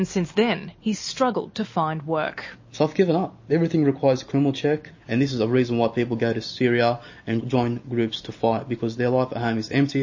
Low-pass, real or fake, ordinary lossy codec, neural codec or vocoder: 7.2 kHz; real; MP3, 32 kbps; none